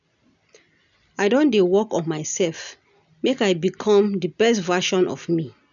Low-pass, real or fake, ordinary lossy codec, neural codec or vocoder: 7.2 kHz; real; none; none